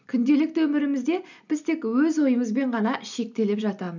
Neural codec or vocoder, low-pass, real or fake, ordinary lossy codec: none; 7.2 kHz; real; none